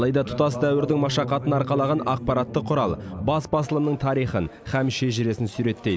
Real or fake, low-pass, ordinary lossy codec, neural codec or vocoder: real; none; none; none